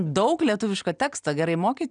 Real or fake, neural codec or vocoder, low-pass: real; none; 9.9 kHz